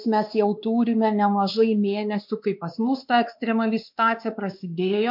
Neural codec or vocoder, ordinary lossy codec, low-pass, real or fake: codec, 16 kHz, 2 kbps, X-Codec, WavLM features, trained on Multilingual LibriSpeech; MP3, 48 kbps; 5.4 kHz; fake